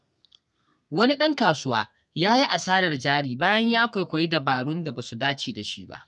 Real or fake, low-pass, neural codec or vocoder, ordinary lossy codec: fake; 10.8 kHz; codec, 32 kHz, 1.9 kbps, SNAC; none